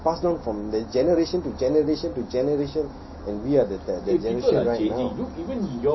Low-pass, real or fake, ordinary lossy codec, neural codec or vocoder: 7.2 kHz; real; MP3, 24 kbps; none